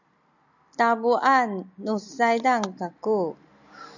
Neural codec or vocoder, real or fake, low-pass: none; real; 7.2 kHz